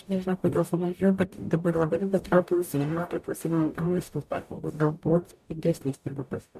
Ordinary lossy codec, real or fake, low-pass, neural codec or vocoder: MP3, 96 kbps; fake; 14.4 kHz; codec, 44.1 kHz, 0.9 kbps, DAC